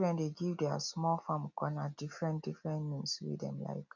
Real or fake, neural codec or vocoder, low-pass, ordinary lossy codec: real; none; 7.2 kHz; none